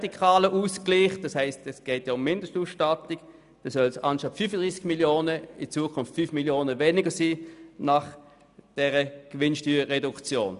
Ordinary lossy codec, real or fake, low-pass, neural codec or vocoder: none; real; 10.8 kHz; none